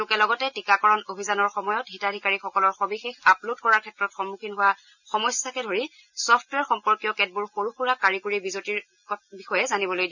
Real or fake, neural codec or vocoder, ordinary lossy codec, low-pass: real; none; none; 7.2 kHz